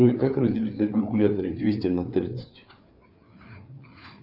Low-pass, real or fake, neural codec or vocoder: 5.4 kHz; fake; codec, 16 kHz, 4 kbps, FreqCodec, larger model